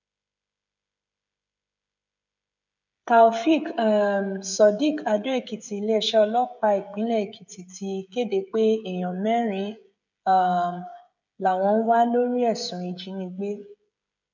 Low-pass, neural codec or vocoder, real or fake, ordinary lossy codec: 7.2 kHz; codec, 16 kHz, 16 kbps, FreqCodec, smaller model; fake; none